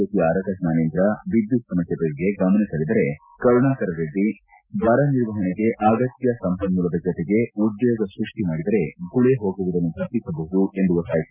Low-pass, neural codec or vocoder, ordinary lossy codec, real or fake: 3.6 kHz; none; none; real